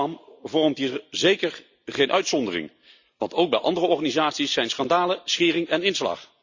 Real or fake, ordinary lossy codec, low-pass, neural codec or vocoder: real; Opus, 64 kbps; 7.2 kHz; none